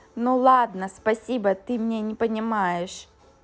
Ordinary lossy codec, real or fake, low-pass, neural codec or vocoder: none; real; none; none